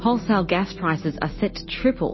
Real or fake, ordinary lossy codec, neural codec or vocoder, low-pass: real; MP3, 24 kbps; none; 7.2 kHz